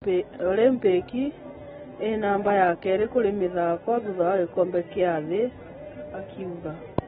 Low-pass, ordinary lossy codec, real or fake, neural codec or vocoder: 10.8 kHz; AAC, 16 kbps; real; none